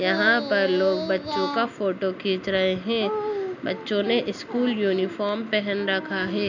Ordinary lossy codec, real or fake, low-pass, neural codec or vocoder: none; real; 7.2 kHz; none